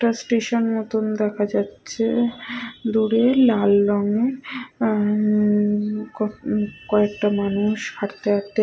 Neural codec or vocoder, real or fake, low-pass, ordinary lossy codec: none; real; none; none